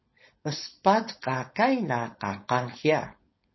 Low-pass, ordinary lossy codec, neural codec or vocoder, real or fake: 7.2 kHz; MP3, 24 kbps; codec, 16 kHz, 4.8 kbps, FACodec; fake